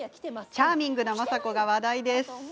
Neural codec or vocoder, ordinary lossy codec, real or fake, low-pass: none; none; real; none